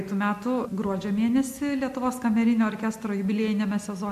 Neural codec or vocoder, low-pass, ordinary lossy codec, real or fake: none; 14.4 kHz; MP3, 64 kbps; real